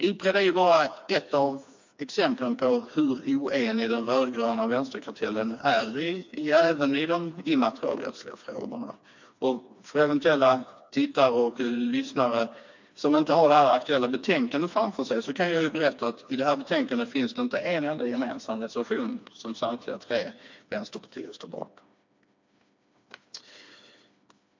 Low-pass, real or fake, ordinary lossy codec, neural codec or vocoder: 7.2 kHz; fake; MP3, 48 kbps; codec, 16 kHz, 2 kbps, FreqCodec, smaller model